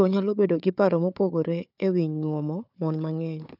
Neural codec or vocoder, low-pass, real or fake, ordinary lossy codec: codec, 16 kHz, 4 kbps, FunCodec, trained on Chinese and English, 50 frames a second; 5.4 kHz; fake; none